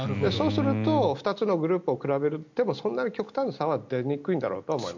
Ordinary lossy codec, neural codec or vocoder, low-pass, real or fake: MP3, 64 kbps; none; 7.2 kHz; real